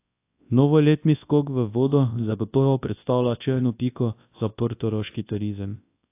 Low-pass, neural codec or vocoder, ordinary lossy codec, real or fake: 3.6 kHz; codec, 24 kHz, 0.9 kbps, WavTokenizer, large speech release; AAC, 24 kbps; fake